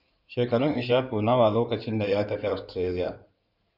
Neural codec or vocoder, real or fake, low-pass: codec, 16 kHz in and 24 kHz out, 2.2 kbps, FireRedTTS-2 codec; fake; 5.4 kHz